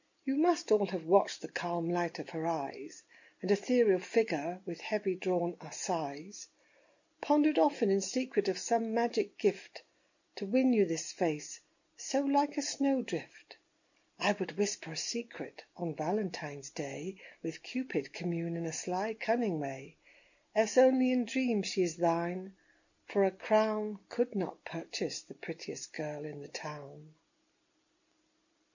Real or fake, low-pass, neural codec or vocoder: real; 7.2 kHz; none